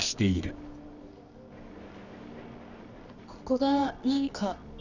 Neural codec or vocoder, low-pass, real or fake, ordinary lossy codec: codec, 24 kHz, 0.9 kbps, WavTokenizer, medium music audio release; 7.2 kHz; fake; none